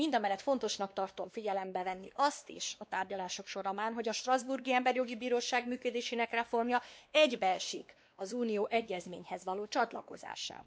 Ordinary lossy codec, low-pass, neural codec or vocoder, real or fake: none; none; codec, 16 kHz, 2 kbps, X-Codec, WavLM features, trained on Multilingual LibriSpeech; fake